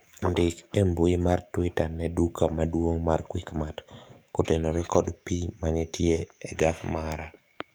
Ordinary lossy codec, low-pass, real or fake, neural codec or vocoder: none; none; fake; codec, 44.1 kHz, 7.8 kbps, DAC